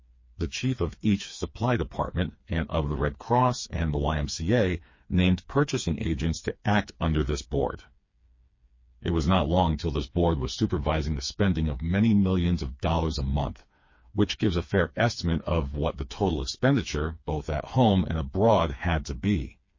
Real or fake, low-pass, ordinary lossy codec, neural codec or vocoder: fake; 7.2 kHz; MP3, 32 kbps; codec, 16 kHz, 4 kbps, FreqCodec, smaller model